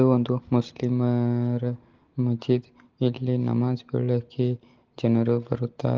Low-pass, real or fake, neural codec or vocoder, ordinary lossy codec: 7.2 kHz; real; none; Opus, 16 kbps